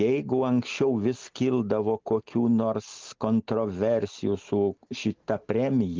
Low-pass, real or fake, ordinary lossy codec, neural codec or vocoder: 7.2 kHz; real; Opus, 32 kbps; none